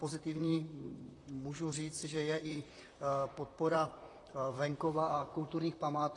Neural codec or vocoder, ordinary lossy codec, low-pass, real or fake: vocoder, 44.1 kHz, 128 mel bands, Pupu-Vocoder; AAC, 32 kbps; 10.8 kHz; fake